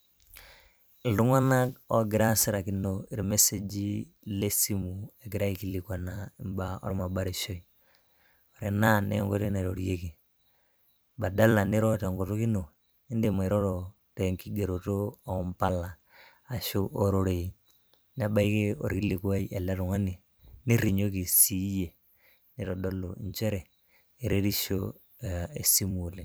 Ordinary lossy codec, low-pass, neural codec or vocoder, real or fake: none; none; vocoder, 44.1 kHz, 128 mel bands every 256 samples, BigVGAN v2; fake